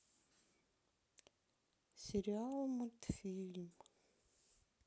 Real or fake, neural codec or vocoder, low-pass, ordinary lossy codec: real; none; none; none